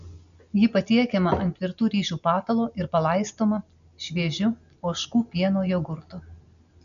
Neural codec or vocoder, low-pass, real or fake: none; 7.2 kHz; real